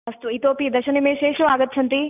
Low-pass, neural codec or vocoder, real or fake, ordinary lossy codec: 3.6 kHz; none; real; none